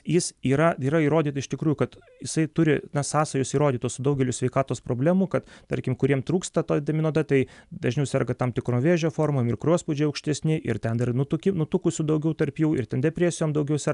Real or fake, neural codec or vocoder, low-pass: real; none; 10.8 kHz